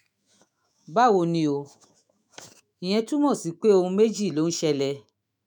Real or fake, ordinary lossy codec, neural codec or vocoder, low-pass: fake; none; autoencoder, 48 kHz, 128 numbers a frame, DAC-VAE, trained on Japanese speech; none